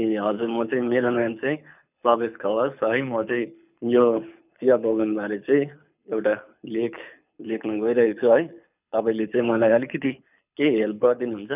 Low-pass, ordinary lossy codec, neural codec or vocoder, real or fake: 3.6 kHz; none; codec, 24 kHz, 6 kbps, HILCodec; fake